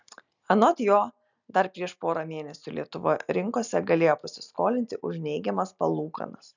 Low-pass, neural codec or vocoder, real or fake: 7.2 kHz; none; real